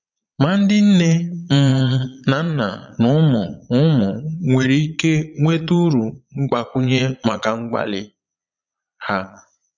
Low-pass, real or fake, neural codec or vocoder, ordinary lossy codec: 7.2 kHz; fake; vocoder, 22.05 kHz, 80 mel bands, Vocos; none